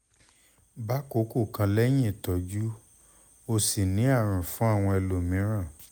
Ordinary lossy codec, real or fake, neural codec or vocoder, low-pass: none; real; none; none